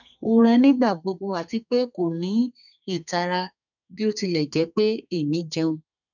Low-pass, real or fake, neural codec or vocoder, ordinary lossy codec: 7.2 kHz; fake; codec, 32 kHz, 1.9 kbps, SNAC; none